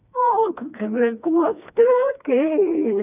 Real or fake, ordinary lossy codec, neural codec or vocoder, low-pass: fake; none; codec, 16 kHz, 2 kbps, FreqCodec, smaller model; 3.6 kHz